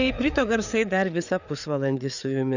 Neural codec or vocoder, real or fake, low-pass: codec, 16 kHz, 4 kbps, FreqCodec, larger model; fake; 7.2 kHz